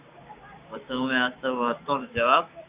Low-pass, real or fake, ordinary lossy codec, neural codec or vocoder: 3.6 kHz; fake; Opus, 64 kbps; codec, 16 kHz, 6 kbps, DAC